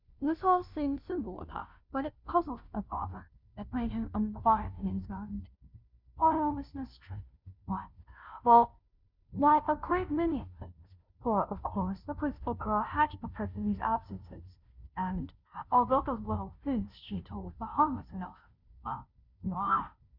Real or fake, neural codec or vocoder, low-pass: fake; codec, 16 kHz, 0.5 kbps, FunCodec, trained on Chinese and English, 25 frames a second; 5.4 kHz